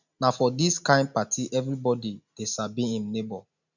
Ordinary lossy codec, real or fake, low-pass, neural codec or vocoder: none; real; 7.2 kHz; none